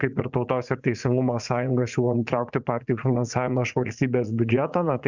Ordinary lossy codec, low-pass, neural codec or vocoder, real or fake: Opus, 64 kbps; 7.2 kHz; vocoder, 44.1 kHz, 80 mel bands, Vocos; fake